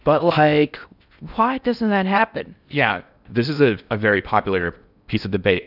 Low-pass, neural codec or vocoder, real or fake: 5.4 kHz; codec, 16 kHz in and 24 kHz out, 0.6 kbps, FocalCodec, streaming, 4096 codes; fake